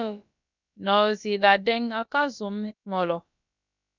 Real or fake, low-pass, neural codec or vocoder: fake; 7.2 kHz; codec, 16 kHz, about 1 kbps, DyCAST, with the encoder's durations